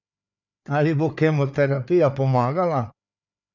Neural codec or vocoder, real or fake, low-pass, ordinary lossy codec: codec, 16 kHz, 4 kbps, FreqCodec, larger model; fake; 7.2 kHz; none